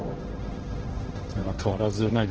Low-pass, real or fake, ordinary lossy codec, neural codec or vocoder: 7.2 kHz; fake; Opus, 16 kbps; codec, 16 kHz, 1.1 kbps, Voila-Tokenizer